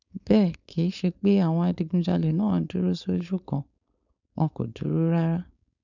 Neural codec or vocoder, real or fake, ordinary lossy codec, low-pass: codec, 16 kHz, 4.8 kbps, FACodec; fake; none; 7.2 kHz